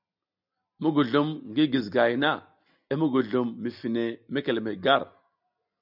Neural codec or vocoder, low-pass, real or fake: none; 5.4 kHz; real